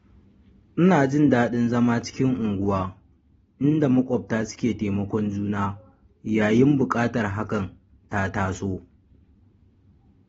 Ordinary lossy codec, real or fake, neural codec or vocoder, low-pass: AAC, 24 kbps; real; none; 19.8 kHz